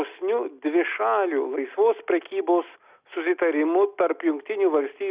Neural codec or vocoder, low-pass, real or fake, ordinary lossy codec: none; 3.6 kHz; real; Opus, 64 kbps